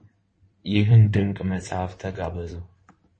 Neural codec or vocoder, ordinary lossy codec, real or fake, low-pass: codec, 16 kHz in and 24 kHz out, 2.2 kbps, FireRedTTS-2 codec; MP3, 32 kbps; fake; 9.9 kHz